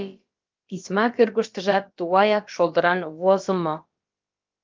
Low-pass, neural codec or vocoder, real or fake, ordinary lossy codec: 7.2 kHz; codec, 16 kHz, about 1 kbps, DyCAST, with the encoder's durations; fake; Opus, 24 kbps